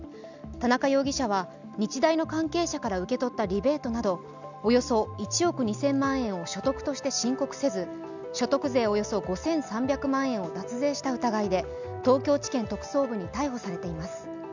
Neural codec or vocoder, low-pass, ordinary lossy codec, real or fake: none; 7.2 kHz; none; real